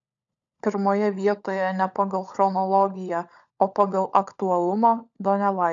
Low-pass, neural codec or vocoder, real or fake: 7.2 kHz; codec, 16 kHz, 16 kbps, FunCodec, trained on LibriTTS, 50 frames a second; fake